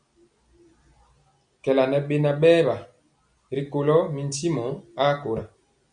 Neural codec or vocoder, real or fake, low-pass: none; real; 9.9 kHz